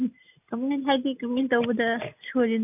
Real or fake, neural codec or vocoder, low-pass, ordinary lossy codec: real; none; 3.6 kHz; none